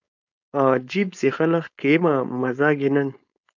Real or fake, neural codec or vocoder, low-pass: fake; codec, 16 kHz, 4.8 kbps, FACodec; 7.2 kHz